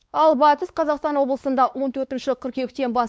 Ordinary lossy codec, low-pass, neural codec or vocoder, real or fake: none; none; codec, 16 kHz, 2 kbps, X-Codec, WavLM features, trained on Multilingual LibriSpeech; fake